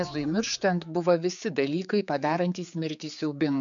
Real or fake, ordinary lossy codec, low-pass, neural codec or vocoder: fake; MP3, 96 kbps; 7.2 kHz; codec, 16 kHz, 4 kbps, X-Codec, HuBERT features, trained on general audio